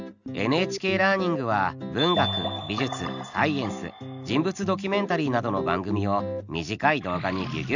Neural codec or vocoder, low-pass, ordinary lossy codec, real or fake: none; 7.2 kHz; none; real